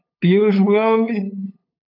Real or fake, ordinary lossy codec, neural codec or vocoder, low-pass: fake; AAC, 48 kbps; codec, 16 kHz, 8 kbps, FunCodec, trained on LibriTTS, 25 frames a second; 5.4 kHz